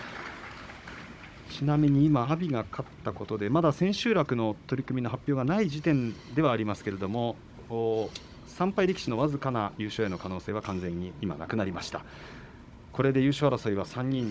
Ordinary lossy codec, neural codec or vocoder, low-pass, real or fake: none; codec, 16 kHz, 16 kbps, FunCodec, trained on Chinese and English, 50 frames a second; none; fake